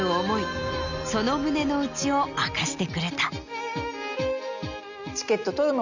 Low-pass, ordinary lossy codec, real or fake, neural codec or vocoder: 7.2 kHz; none; real; none